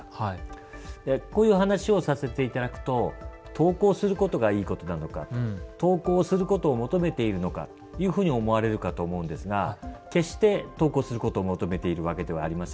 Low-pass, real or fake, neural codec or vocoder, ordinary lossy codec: none; real; none; none